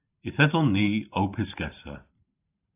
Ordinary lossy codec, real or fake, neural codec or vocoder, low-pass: AAC, 32 kbps; fake; vocoder, 44.1 kHz, 128 mel bands every 512 samples, BigVGAN v2; 3.6 kHz